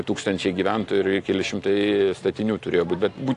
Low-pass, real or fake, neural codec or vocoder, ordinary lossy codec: 10.8 kHz; real; none; AAC, 48 kbps